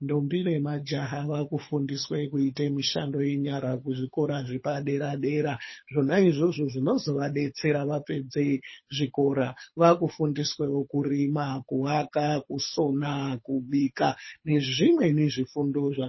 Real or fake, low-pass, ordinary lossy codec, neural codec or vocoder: fake; 7.2 kHz; MP3, 24 kbps; codec, 24 kHz, 6 kbps, HILCodec